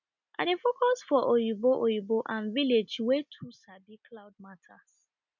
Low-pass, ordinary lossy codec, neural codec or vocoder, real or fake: 7.2 kHz; none; none; real